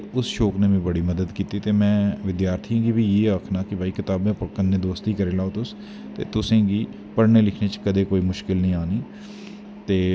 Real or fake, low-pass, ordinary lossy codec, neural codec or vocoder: real; none; none; none